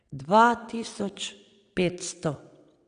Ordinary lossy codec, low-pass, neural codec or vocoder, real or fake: none; 9.9 kHz; vocoder, 22.05 kHz, 80 mel bands, WaveNeXt; fake